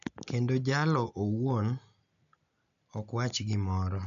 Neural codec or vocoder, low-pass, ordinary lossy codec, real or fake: none; 7.2 kHz; MP3, 64 kbps; real